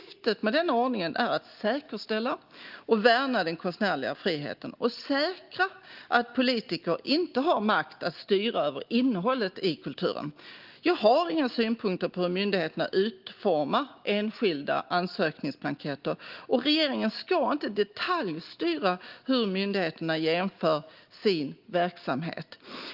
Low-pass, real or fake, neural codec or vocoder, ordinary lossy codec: 5.4 kHz; real; none; Opus, 32 kbps